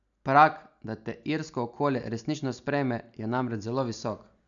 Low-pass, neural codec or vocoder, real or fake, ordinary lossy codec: 7.2 kHz; none; real; none